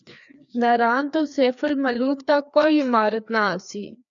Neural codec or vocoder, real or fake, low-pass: codec, 16 kHz, 2 kbps, FreqCodec, larger model; fake; 7.2 kHz